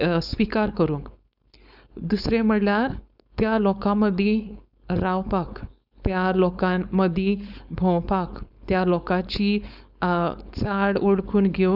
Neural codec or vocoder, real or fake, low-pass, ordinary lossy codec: codec, 16 kHz, 4.8 kbps, FACodec; fake; 5.4 kHz; none